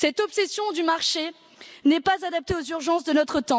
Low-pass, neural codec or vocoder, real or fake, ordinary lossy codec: none; none; real; none